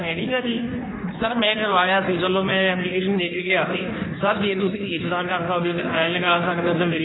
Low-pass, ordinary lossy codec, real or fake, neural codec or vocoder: 7.2 kHz; AAC, 16 kbps; fake; codec, 16 kHz in and 24 kHz out, 1.1 kbps, FireRedTTS-2 codec